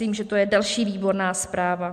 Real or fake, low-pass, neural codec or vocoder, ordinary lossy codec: real; 14.4 kHz; none; MP3, 96 kbps